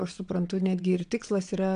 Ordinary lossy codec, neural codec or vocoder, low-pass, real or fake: AAC, 96 kbps; vocoder, 22.05 kHz, 80 mel bands, WaveNeXt; 9.9 kHz; fake